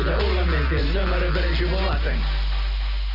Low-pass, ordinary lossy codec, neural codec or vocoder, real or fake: 5.4 kHz; none; none; real